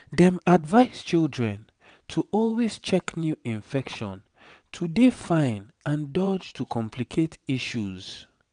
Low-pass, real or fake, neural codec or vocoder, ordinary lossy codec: 9.9 kHz; fake; vocoder, 22.05 kHz, 80 mel bands, WaveNeXt; none